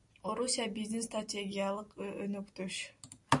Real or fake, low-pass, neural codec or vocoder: real; 10.8 kHz; none